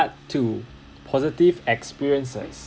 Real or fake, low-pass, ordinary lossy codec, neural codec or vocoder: real; none; none; none